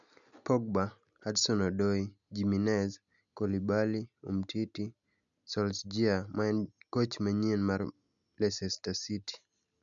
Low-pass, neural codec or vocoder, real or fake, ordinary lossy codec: 7.2 kHz; none; real; none